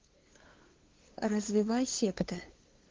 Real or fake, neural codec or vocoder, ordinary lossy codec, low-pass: fake; codec, 16 kHz, 2 kbps, FunCodec, trained on LibriTTS, 25 frames a second; Opus, 16 kbps; 7.2 kHz